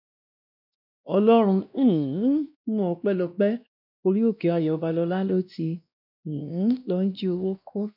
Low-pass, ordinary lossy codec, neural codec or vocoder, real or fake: 5.4 kHz; none; codec, 16 kHz, 1 kbps, X-Codec, WavLM features, trained on Multilingual LibriSpeech; fake